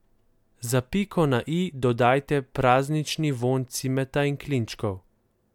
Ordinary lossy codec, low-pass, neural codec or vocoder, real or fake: MP3, 96 kbps; 19.8 kHz; none; real